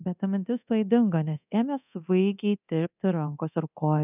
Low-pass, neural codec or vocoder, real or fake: 3.6 kHz; codec, 24 kHz, 0.9 kbps, DualCodec; fake